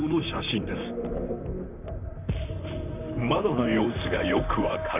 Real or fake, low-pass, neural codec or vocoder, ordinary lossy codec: fake; 3.6 kHz; vocoder, 44.1 kHz, 128 mel bands, Pupu-Vocoder; none